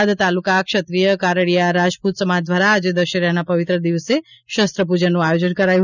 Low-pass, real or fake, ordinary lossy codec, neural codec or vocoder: 7.2 kHz; real; none; none